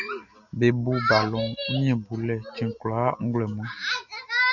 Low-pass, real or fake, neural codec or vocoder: 7.2 kHz; real; none